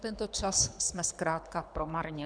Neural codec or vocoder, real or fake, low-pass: codec, 24 kHz, 6 kbps, HILCodec; fake; 9.9 kHz